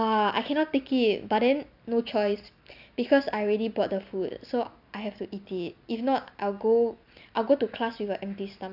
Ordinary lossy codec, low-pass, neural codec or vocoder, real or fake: none; 5.4 kHz; none; real